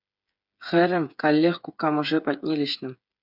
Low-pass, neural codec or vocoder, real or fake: 5.4 kHz; codec, 16 kHz, 8 kbps, FreqCodec, smaller model; fake